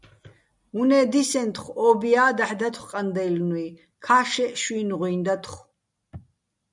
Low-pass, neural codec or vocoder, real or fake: 10.8 kHz; none; real